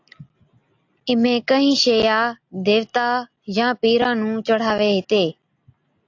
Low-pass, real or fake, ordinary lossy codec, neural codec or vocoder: 7.2 kHz; real; AAC, 48 kbps; none